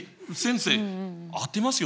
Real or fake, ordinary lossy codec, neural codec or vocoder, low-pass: real; none; none; none